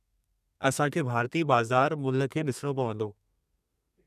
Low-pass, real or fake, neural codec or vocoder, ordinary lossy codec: 14.4 kHz; fake; codec, 32 kHz, 1.9 kbps, SNAC; none